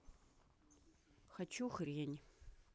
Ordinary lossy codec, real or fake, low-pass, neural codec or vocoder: none; real; none; none